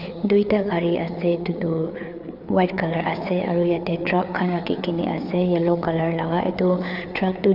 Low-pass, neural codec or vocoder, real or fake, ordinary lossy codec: 5.4 kHz; codec, 16 kHz, 4 kbps, FreqCodec, larger model; fake; none